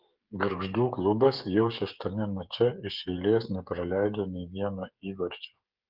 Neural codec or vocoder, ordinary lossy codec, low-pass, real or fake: codec, 16 kHz, 8 kbps, FreqCodec, smaller model; Opus, 24 kbps; 5.4 kHz; fake